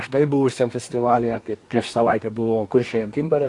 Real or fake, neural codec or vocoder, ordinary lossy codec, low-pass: fake; codec, 24 kHz, 1 kbps, SNAC; AAC, 48 kbps; 10.8 kHz